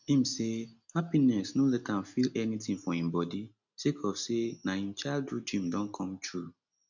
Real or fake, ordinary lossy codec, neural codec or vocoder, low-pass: real; none; none; 7.2 kHz